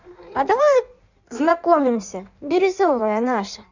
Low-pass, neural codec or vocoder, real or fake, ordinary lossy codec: 7.2 kHz; codec, 16 kHz in and 24 kHz out, 1.1 kbps, FireRedTTS-2 codec; fake; none